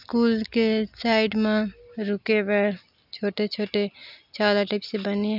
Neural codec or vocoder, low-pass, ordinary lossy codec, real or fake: none; 5.4 kHz; none; real